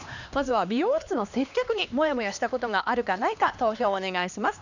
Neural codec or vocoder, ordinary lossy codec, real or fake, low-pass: codec, 16 kHz, 2 kbps, X-Codec, HuBERT features, trained on LibriSpeech; none; fake; 7.2 kHz